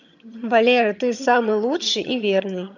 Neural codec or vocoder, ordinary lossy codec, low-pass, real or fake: vocoder, 22.05 kHz, 80 mel bands, HiFi-GAN; none; 7.2 kHz; fake